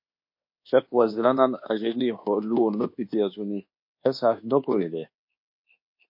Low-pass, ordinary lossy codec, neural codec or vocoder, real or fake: 5.4 kHz; MP3, 32 kbps; codec, 24 kHz, 1.2 kbps, DualCodec; fake